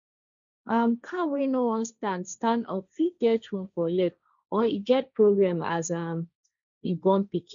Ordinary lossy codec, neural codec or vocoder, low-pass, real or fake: none; codec, 16 kHz, 1.1 kbps, Voila-Tokenizer; 7.2 kHz; fake